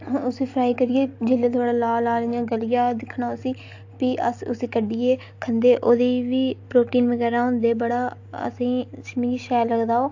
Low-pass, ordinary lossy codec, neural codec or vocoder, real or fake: 7.2 kHz; AAC, 48 kbps; none; real